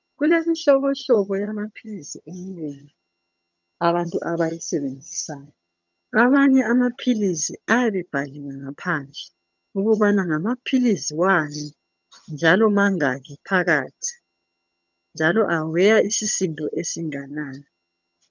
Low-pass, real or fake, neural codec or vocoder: 7.2 kHz; fake; vocoder, 22.05 kHz, 80 mel bands, HiFi-GAN